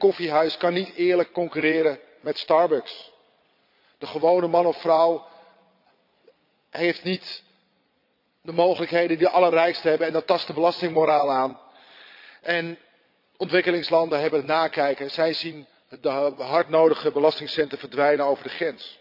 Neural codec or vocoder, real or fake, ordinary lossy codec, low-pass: vocoder, 22.05 kHz, 80 mel bands, Vocos; fake; none; 5.4 kHz